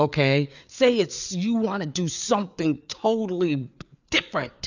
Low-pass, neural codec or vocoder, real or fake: 7.2 kHz; codec, 16 kHz, 4 kbps, FreqCodec, larger model; fake